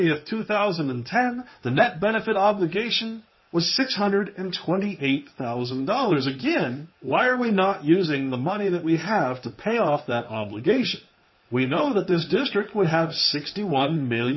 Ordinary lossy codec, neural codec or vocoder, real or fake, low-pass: MP3, 24 kbps; codec, 16 kHz in and 24 kHz out, 2.2 kbps, FireRedTTS-2 codec; fake; 7.2 kHz